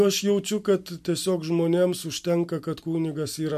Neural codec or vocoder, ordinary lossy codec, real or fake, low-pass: none; MP3, 64 kbps; real; 14.4 kHz